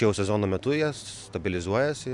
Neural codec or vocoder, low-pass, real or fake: none; 10.8 kHz; real